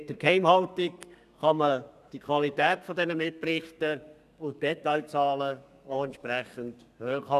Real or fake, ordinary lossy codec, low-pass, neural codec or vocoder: fake; none; 14.4 kHz; codec, 32 kHz, 1.9 kbps, SNAC